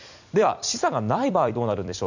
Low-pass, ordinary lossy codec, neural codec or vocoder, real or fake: 7.2 kHz; none; vocoder, 44.1 kHz, 128 mel bands every 256 samples, BigVGAN v2; fake